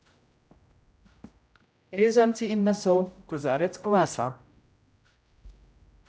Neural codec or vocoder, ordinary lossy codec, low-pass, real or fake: codec, 16 kHz, 0.5 kbps, X-Codec, HuBERT features, trained on general audio; none; none; fake